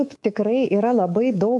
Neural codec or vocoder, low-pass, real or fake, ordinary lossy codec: codec, 24 kHz, 3.1 kbps, DualCodec; 10.8 kHz; fake; MP3, 64 kbps